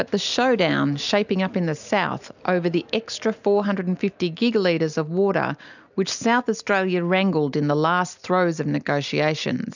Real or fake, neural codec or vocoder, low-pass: real; none; 7.2 kHz